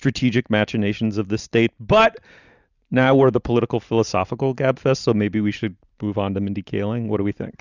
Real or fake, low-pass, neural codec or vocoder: real; 7.2 kHz; none